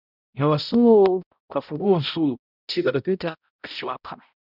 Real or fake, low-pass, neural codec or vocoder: fake; 5.4 kHz; codec, 16 kHz, 0.5 kbps, X-Codec, HuBERT features, trained on balanced general audio